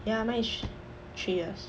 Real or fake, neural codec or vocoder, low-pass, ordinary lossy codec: real; none; none; none